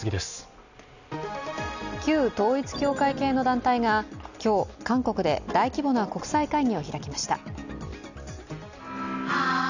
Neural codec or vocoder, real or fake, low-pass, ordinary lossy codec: none; real; 7.2 kHz; none